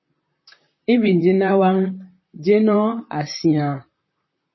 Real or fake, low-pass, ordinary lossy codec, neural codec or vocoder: fake; 7.2 kHz; MP3, 24 kbps; vocoder, 44.1 kHz, 128 mel bands, Pupu-Vocoder